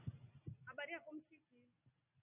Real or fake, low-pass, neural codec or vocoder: real; 3.6 kHz; none